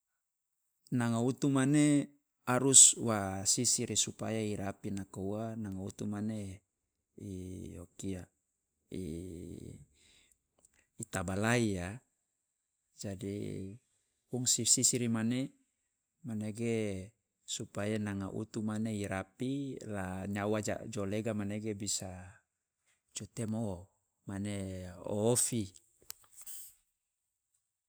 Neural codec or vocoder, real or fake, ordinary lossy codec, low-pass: none; real; none; none